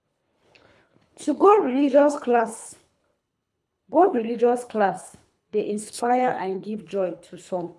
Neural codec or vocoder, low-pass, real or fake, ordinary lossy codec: codec, 24 kHz, 3 kbps, HILCodec; none; fake; none